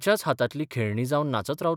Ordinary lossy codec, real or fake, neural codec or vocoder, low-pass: none; fake; vocoder, 44.1 kHz, 128 mel bands every 256 samples, BigVGAN v2; 19.8 kHz